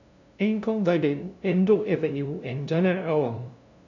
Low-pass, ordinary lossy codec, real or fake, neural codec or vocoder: 7.2 kHz; none; fake; codec, 16 kHz, 0.5 kbps, FunCodec, trained on LibriTTS, 25 frames a second